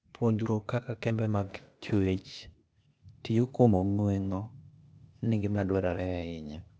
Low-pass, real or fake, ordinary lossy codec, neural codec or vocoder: none; fake; none; codec, 16 kHz, 0.8 kbps, ZipCodec